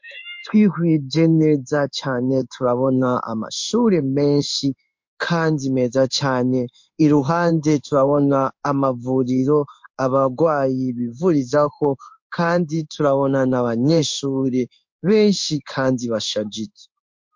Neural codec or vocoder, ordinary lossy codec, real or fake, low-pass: codec, 16 kHz in and 24 kHz out, 1 kbps, XY-Tokenizer; MP3, 48 kbps; fake; 7.2 kHz